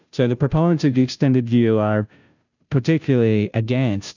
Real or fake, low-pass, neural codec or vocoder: fake; 7.2 kHz; codec, 16 kHz, 0.5 kbps, FunCodec, trained on Chinese and English, 25 frames a second